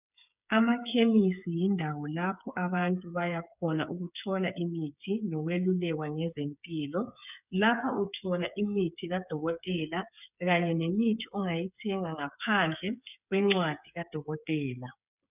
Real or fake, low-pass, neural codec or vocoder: fake; 3.6 kHz; codec, 16 kHz, 8 kbps, FreqCodec, smaller model